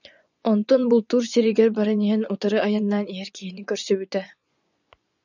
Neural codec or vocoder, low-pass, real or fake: vocoder, 24 kHz, 100 mel bands, Vocos; 7.2 kHz; fake